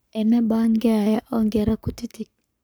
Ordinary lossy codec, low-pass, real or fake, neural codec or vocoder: none; none; fake; codec, 44.1 kHz, 7.8 kbps, Pupu-Codec